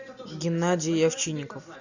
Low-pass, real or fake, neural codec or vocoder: 7.2 kHz; real; none